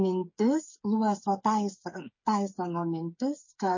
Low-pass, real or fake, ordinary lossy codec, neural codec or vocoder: 7.2 kHz; fake; MP3, 32 kbps; codec, 16 kHz, 8 kbps, FreqCodec, smaller model